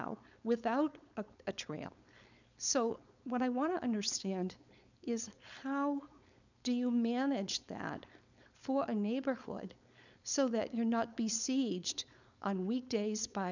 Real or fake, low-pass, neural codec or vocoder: fake; 7.2 kHz; codec, 16 kHz, 4.8 kbps, FACodec